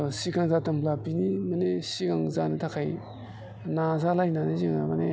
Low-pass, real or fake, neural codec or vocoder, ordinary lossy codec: none; real; none; none